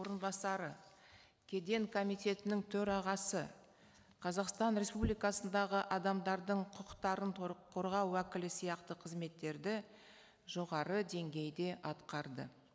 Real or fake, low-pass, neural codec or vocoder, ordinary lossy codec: real; none; none; none